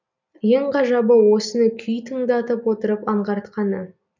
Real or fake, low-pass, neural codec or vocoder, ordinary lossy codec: real; 7.2 kHz; none; none